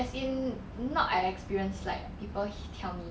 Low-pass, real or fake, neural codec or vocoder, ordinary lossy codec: none; real; none; none